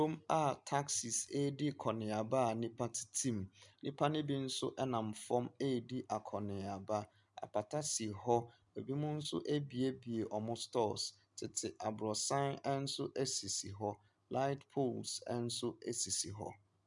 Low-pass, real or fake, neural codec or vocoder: 10.8 kHz; real; none